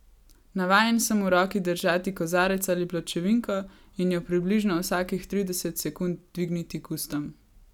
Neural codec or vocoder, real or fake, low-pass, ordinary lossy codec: none; real; 19.8 kHz; none